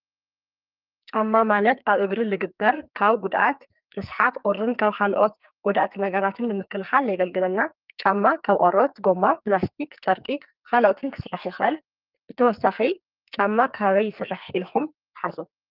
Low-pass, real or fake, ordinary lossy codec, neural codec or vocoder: 5.4 kHz; fake; Opus, 24 kbps; codec, 44.1 kHz, 2.6 kbps, SNAC